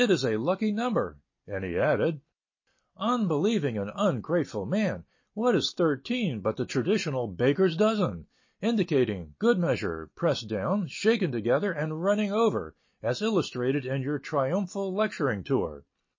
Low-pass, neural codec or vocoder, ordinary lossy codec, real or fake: 7.2 kHz; none; MP3, 32 kbps; real